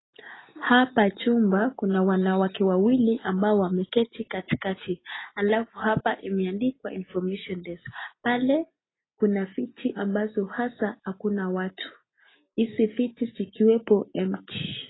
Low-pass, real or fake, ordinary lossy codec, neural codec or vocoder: 7.2 kHz; real; AAC, 16 kbps; none